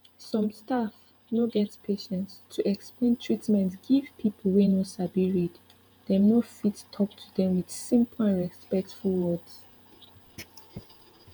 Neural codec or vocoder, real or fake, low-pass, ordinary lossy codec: vocoder, 48 kHz, 128 mel bands, Vocos; fake; none; none